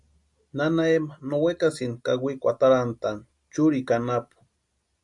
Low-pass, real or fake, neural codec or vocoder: 10.8 kHz; real; none